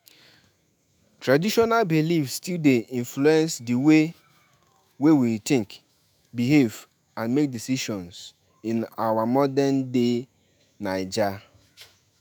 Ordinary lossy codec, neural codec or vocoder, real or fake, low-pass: none; autoencoder, 48 kHz, 128 numbers a frame, DAC-VAE, trained on Japanese speech; fake; none